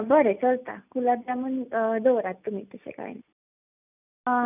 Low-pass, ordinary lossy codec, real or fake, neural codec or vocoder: 3.6 kHz; none; real; none